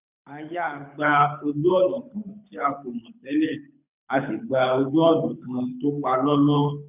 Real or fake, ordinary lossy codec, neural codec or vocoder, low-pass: fake; none; codec, 24 kHz, 6 kbps, HILCodec; 3.6 kHz